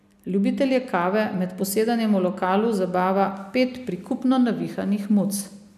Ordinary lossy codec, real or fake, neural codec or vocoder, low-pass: none; real; none; 14.4 kHz